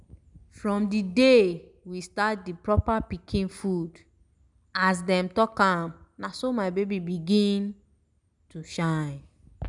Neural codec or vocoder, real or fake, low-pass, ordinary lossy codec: none; real; 10.8 kHz; none